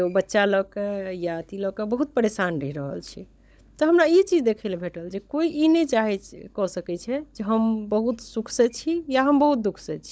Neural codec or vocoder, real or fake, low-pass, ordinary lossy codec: codec, 16 kHz, 16 kbps, FunCodec, trained on LibriTTS, 50 frames a second; fake; none; none